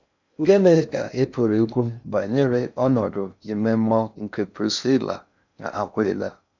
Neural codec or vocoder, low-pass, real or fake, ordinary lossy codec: codec, 16 kHz in and 24 kHz out, 0.6 kbps, FocalCodec, streaming, 4096 codes; 7.2 kHz; fake; none